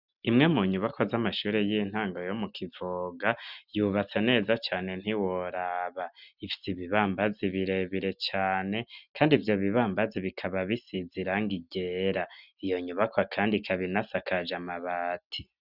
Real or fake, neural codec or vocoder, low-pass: real; none; 5.4 kHz